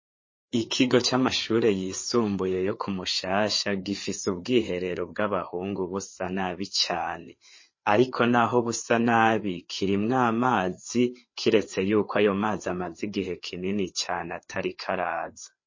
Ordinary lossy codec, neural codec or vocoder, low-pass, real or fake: MP3, 32 kbps; codec, 16 kHz, 4 kbps, FreqCodec, larger model; 7.2 kHz; fake